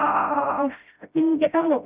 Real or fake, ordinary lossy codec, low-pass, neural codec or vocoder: fake; Opus, 64 kbps; 3.6 kHz; codec, 16 kHz, 0.5 kbps, FreqCodec, smaller model